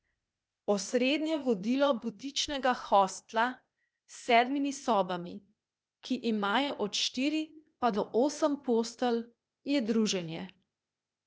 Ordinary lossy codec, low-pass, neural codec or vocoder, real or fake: none; none; codec, 16 kHz, 0.8 kbps, ZipCodec; fake